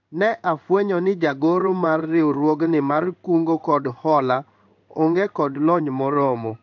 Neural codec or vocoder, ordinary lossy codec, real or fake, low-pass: codec, 16 kHz in and 24 kHz out, 1 kbps, XY-Tokenizer; MP3, 64 kbps; fake; 7.2 kHz